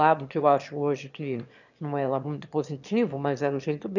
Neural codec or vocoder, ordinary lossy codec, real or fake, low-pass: autoencoder, 22.05 kHz, a latent of 192 numbers a frame, VITS, trained on one speaker; none; fake; 7.2 kHz